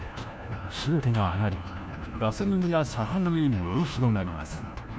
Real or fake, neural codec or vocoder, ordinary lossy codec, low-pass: fake; codec, 16 kHz, 1 kbps, FunCodec, trained on LibriTTS, 50 frames a second; none; none